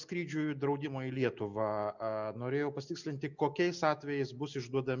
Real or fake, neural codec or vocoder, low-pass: real; none; 7.2 kHz